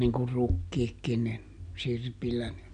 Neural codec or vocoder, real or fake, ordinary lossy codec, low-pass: none; real; none; 9.9 kHz